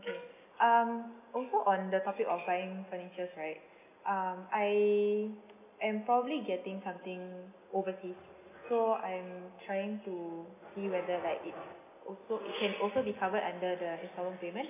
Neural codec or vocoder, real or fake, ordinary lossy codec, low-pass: none; real; none; 3.6 kHz